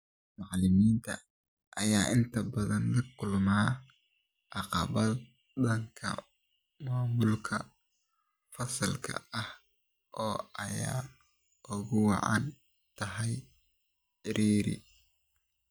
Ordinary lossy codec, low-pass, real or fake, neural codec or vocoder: none; none; real; none